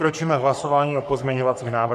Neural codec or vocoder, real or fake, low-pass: codec, 44.1 kHz, 3.4 kbps, Pupu-Codec; fake; 14.4 kHz